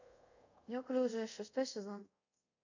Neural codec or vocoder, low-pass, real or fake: codec, 24 kHz, 0.5 kbps, DualCodec; 7.2 kHz; fake